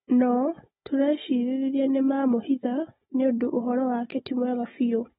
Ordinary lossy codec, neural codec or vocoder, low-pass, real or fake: AAC, 16 kbps; codec, 16 kHz, 16 kbps, FunCodec, trained on Chinese and English, 50 frames a second; 7.2 kHz; fake